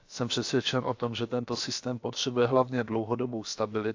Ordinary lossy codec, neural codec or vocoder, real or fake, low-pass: AAC, 48 kbps; codec, 16 kHz, about 1 kbps, DyCAST, with the encoder's durations; fake; 7.2 kHz